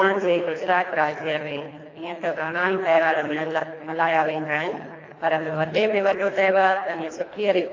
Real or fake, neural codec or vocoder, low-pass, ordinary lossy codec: fake; codec, 24 kHz, 1.5 kbps, HILCodec; 7.2 kHz; AAC, 32 kbps